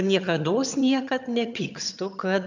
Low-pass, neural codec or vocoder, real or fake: 7.2 kHz; vocoder, 22.05 kHz, 80 mel bands, HiFi-GAN; fake